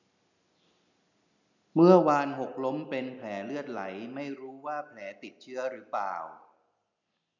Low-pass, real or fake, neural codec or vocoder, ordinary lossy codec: 7.2 kHz; real; none; none